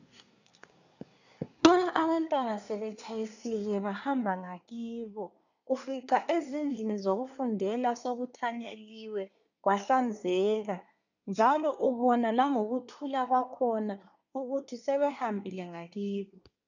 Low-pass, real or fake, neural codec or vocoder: 7.2 kHz; fake; codec, 24 kHz, 1 kbps, SNAC